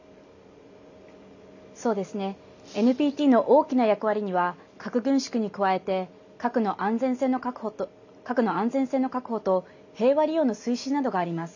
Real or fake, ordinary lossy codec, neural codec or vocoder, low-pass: real; none; none; 7.2 kHz